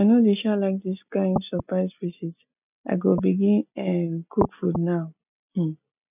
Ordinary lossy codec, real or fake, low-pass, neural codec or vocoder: none; real; 3.6 kHz; none